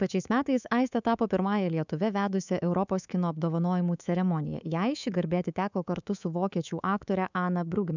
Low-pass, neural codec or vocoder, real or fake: 7.2 kHz; codec, 24 kHz, 3.1 kbps, DualCodec; fake